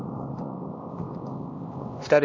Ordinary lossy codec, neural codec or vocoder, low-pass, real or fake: MP3, 32 kbps; codec, 16 kHz, 2 kbps, X-Codec, HuBERT features, trained on LibriSpeech; 7.2 kHz; fake